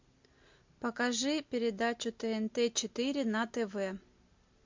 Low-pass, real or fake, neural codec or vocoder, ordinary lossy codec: 7.2 kHz; real; none; MP3, 48 kbps